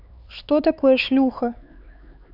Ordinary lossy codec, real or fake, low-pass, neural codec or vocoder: none; fake; 5.4 kHz; codec, 16 kHz, 4 kbps, X-Codec, HuBERT features, trained on LibriSpeech